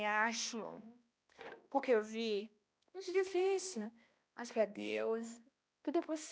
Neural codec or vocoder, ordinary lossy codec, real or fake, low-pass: codec, 16 kHz, 1 kbps, X-Codec, HuBERT features, trained on balanced general audio; none; fake; none